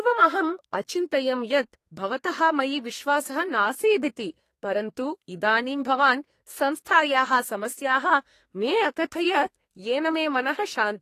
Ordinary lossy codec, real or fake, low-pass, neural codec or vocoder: AAC, 48 kbps; fake; 14.4 kHz; codec, 32 kHz, 1.9 kbps, SNAC